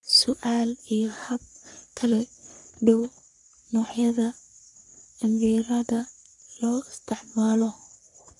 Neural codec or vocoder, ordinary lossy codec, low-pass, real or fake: codec, 44.1 kHz, 3.4 kbps, Pupu-Codec; none; 10.8 kHz; fake